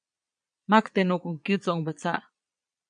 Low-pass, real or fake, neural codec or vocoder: 9.9 kHz; fake; vocoder, 22.05 kHz, 80 mel bands, Vocos